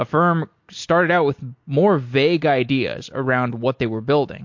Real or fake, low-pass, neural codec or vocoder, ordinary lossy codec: real; 7.2 kHz; none; MP3, 48 kbps